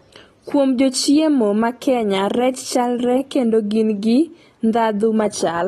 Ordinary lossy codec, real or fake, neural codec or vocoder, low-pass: AAC, 32 kbps; real; none; 19.8 kHz